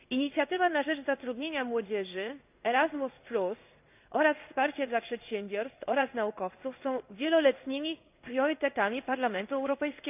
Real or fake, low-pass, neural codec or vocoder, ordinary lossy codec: fake; 3.6 kHz; codec, 16 kHz in and 24 kHz out, 1 kbps, XY-Tokenizer; none